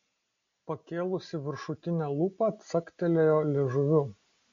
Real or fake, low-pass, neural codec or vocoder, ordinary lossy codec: real; 7.2 kHz; none; MP3, 48 kbps